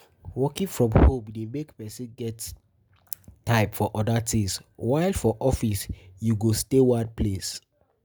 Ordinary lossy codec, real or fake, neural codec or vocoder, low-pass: none; real; none; none